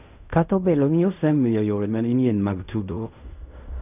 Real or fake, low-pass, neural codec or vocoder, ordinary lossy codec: fake; 3.6 kHz; codec, 16 kHz in and 24 kHz out, 0.4 kbps, LongCat-Audio-Codec, fine tuned four codebook decoder; none